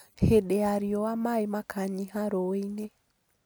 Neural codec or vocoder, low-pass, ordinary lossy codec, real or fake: none; none; none; real